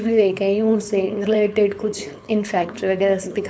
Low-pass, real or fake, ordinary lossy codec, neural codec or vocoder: none; fake; none; codec, 16 kHz, 4.8 kbps, FACodec